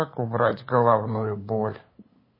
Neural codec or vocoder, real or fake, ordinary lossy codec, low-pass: codec, 16 kHz, 8 kbps, FunCodec, trained on LibriTTS, 25 frames a second; fake; MP3, 24 kbps; 5.4 kHz